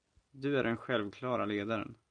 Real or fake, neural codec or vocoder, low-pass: real; none; 9.9 kHz